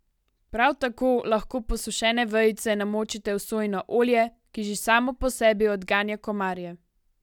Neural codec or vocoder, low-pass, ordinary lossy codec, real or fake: none; 19.8 kHz; none; real